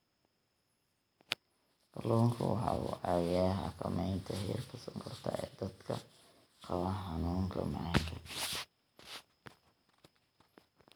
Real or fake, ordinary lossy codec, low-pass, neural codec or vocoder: real; none; none; none